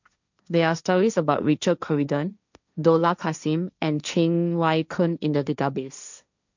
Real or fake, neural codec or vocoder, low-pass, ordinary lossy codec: fake; codec, 16 kHz, 1.1 kbps, Voila-Tokenizer; 7.2 kHz; none